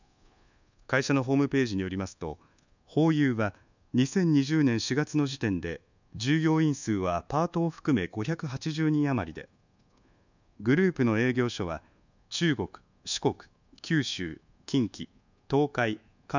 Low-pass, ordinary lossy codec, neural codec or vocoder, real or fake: 7.2 kHz; none; codec, 24 kHz, 1.2 kbps, DualCodec; fake